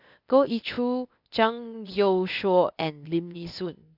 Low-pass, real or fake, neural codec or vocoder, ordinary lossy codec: 5.4 kHz; fake; codec, 16 kHz, 0.8 kbps, ZipCodec; none